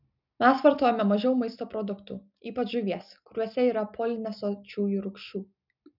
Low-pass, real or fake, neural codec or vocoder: 5.4 kHz; real; none